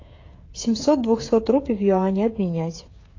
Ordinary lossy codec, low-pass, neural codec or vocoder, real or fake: AAC, 32 kbps; 7.2 kHz; codec, 16 kHz, 16 kbps, FreqCodec, smaller model; fake